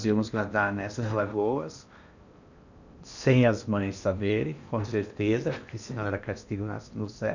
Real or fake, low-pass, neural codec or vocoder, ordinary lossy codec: fake; 7.2 kHz; codec, 16 kHz in and 24 kHz out, 0.8 kbps, FocalCodec, streaming, 65536 codes; none